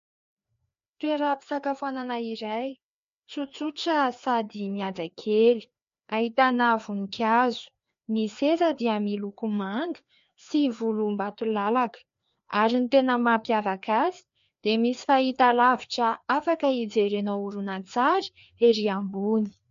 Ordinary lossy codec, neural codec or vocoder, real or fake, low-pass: MP3, 48 kbps; codec, 16 kHz, 2 kbps, FreqCodec, larger model; fake; 7.2 kHz